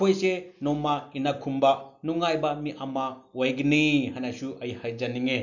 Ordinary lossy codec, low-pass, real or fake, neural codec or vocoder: none; 7.2 kHz; real; none